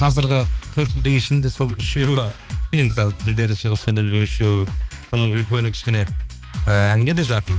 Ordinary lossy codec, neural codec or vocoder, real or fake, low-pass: none; codec, 16 kHz, 2 kbps, X-Codec, HuBERT features, trained on balanced general audio; fake; none